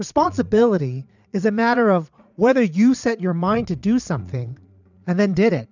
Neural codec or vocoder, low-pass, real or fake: vocoder, 22.05 kHz, 80 mel bands, Vocos; 7.2 kHz; fake